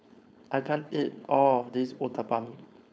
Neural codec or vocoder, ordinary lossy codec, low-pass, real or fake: codec, 16 kHz, 4.8 kbps, FACodec; none; none; fake